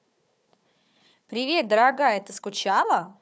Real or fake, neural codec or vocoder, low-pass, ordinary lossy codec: fake; codec, 16 kHz, 4 kbps, FunCodec, trained on Chinese and English, 50 frames a second; none; none